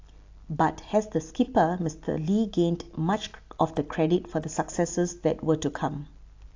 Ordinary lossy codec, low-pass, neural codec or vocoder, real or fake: AAC, 48 kbps; 7.2 kHz; none; real